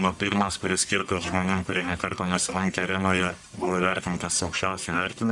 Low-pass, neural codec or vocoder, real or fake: 10.8 kHz; codec, 44.1 kHz, 1.7 kbps, Pupu-Codec; fake